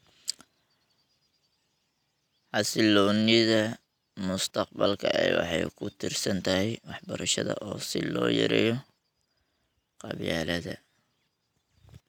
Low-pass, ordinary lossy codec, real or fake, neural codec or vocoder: 19.8 kHz; none; real; none